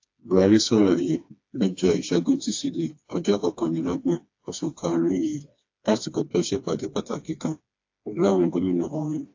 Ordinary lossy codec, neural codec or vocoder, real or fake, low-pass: AAC, 48 kbps; codec, 16 kHz, 2 kbps, FreqCodec, smaller model; fake; 7.2 kHz